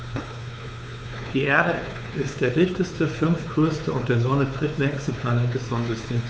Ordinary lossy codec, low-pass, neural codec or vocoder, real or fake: none; none; codec, 16 kHz, 4 kbps, X-Codec, WavLM features, trained on Multilingual LibriSpeech; fake